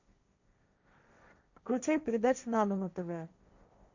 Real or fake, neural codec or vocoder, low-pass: fake; codec, 16 kHz, 1.1 kbps, Voila-Tokenizer; 7.2 kHz